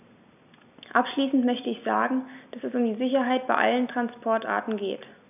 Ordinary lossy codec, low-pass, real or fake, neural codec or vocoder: none; 3.6 kHz; real; none